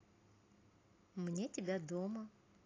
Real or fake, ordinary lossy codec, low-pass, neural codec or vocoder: real; AAC, 32 kbps; 7.2 kHz; none